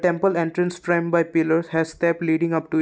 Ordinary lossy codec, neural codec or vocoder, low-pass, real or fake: none; none; none; real